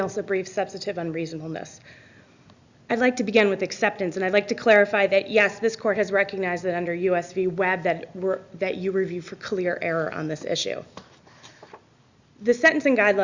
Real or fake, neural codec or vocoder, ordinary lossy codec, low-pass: real; none; Opus, 64 kbps; 7.2 kHz